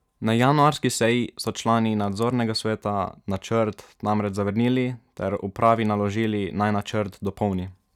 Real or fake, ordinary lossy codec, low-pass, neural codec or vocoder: real; none; 19.8 kHz; none